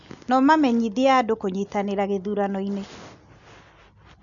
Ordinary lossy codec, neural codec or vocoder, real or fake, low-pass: none; none; real; 7.2 kHz